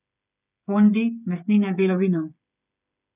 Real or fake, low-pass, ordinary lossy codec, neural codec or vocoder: fake; 3.6 kHz; none; codec, 16 kHz, 8 kbps, FreqCodec, smaller model